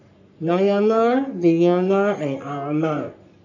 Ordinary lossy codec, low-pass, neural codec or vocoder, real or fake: none; 7.2 kHz; codec, 44.1 kHz, 3.4 kbps, Pupu-Codec; fake